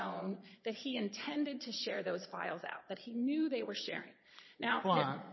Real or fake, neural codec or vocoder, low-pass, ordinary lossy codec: fake; vocoder, 22.05 kHz, 80 mel bands, Vocos; 7.2 kHz; MP3, 24 kbps